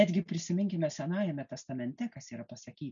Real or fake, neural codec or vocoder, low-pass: real; none; 7.2 kHz